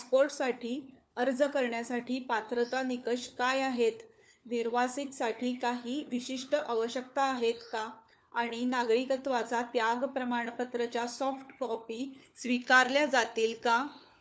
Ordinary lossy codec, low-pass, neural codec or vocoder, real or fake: none; none; codec, 16 kHz, 4 kbps, FunCodec, trained on LibriTTS, 50 frames a second; fake